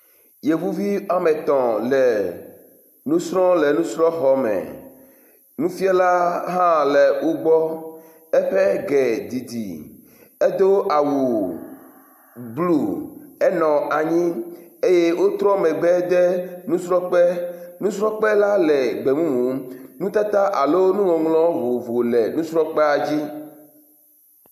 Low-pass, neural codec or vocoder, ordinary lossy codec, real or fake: 14.4 kHz; none; AAC, 96 kbps; real